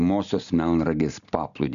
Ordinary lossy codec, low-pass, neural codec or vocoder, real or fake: MP3, 96 kbps; 7.2 kHz; none; real